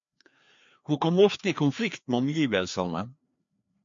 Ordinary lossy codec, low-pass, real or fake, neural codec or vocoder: MP3, 48 kbps; 7.2 kHz; fake; codec, 16 kHz, 2 kbps, FreqCodec, larger model